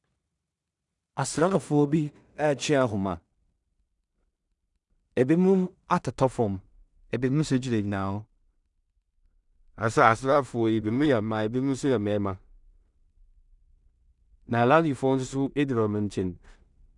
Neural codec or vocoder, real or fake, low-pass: codec, 16 kHz in and 24 kHz out, 0.4 kbps, LongCat-Audio-Codec, two codebook decoder; fake; 10.8 kHz